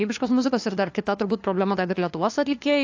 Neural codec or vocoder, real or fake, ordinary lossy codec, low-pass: codec, 16 kHz, 2 kbps, FunCodec, trained on LibriTTS, 25 frames a second; fake; AAC, 48 kbps; 7.2 kHz